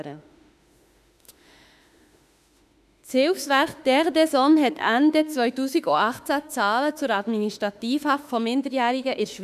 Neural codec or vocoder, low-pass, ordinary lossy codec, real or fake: autoencoder, 48 kHz, 32 numbers a frame, DAC-VAE, trained on Japanese speech; 14.4 kHz; none; fake